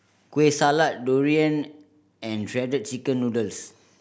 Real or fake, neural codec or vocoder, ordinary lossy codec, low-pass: real; none; none; none